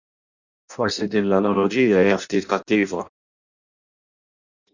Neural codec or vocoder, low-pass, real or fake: codec, 16 kHz in and 24 kHz out, 0.6 kbps, FireRedTTS-2 codec; 7.2 kHz; fake